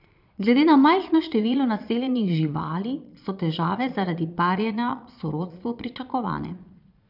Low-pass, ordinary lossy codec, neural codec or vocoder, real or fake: 5.4 kHz; none; vocoder, 22.05 kHz, 80 mel bands, Vocos; fake